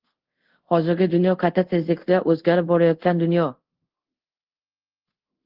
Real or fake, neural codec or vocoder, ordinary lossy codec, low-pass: fake; codec, 24 kHz, 0.5 kbps, DualCodec; Opus, 16 kbps; 5.4 kHz